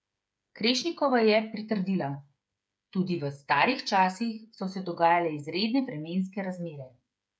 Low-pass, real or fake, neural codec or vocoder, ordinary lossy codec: none; fake; codec, 16 kHz, 8 kbps, FreqCodec, smaller model; none